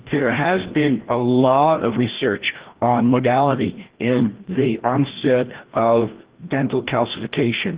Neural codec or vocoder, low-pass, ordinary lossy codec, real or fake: codec, 16 kHz, 1 kbps, FreqCodec, larger model; 3.6 kHz; Opus, 16 kbps; fake